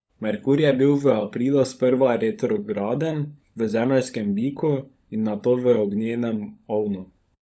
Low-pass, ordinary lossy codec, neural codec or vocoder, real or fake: none; none; codec, 16 kHz, 16 kbps, FunCodec, trained on LibriTTS, 50 frames a second; fake